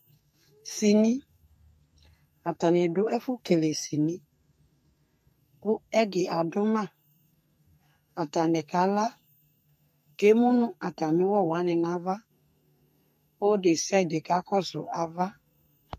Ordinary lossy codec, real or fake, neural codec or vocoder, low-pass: MP3, 64 kbps; fake; codec, 44.1 kHz, 2.6 kbps, SNAC; 14.4 kHz